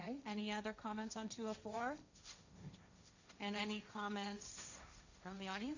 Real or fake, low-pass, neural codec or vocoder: fake; 7.2 kHz; codec, 16 kHz, 1.1 kbps, Voila-Tokenizer